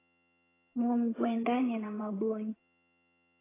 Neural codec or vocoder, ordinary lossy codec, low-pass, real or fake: vocoder, 22.05 kHz, 80 mel bands, HiFi-GAN; AAC, 16 kbps; 3.6 kHz; fake